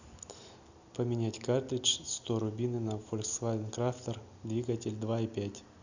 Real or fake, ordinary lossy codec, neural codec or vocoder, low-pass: real; none; none; 7.2 kHz